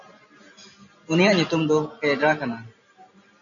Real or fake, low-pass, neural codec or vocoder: real; 7.2 kHz; none